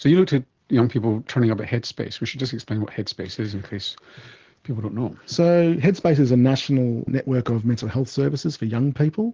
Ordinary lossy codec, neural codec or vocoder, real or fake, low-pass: Opus, 16 kbps; none; real; 7.2 kHz